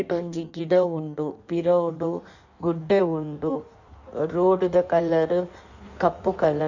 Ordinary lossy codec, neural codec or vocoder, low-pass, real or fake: none; codec, 16 kHz in and 24 kHz out, 1.1 kbps, FireRedTTS-2 codec; 7.2 kHz; fake